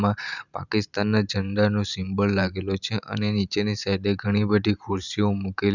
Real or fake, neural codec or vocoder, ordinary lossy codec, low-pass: fake; vocoder, 44.1 kHz, 128 mel bands every 512 samples, BigVGAN v2; none; 7.2 kHz